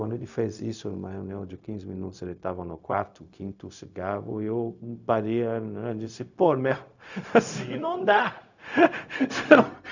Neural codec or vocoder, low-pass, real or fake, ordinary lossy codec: codec, 16 kHz, 0.4 kbps, LongCat-Audio-Codec; 7.2 kHz; fake; none